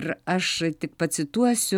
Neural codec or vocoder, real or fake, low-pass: vocoder, 44.1 kHz, 128 mel bands every 256 samples, BigVGAN v2; fake; 19.8 kHz